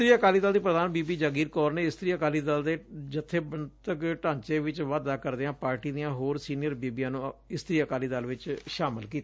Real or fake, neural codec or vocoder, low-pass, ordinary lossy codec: real; none; none; none